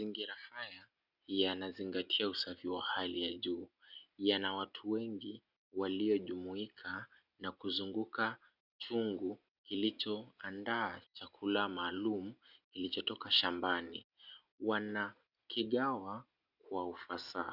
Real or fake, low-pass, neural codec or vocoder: real; 5.4 kHz; none